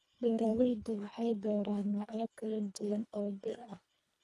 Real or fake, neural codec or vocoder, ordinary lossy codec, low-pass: fake; codec, 24 kHz, 1.5 kbps, HILCodec; none; none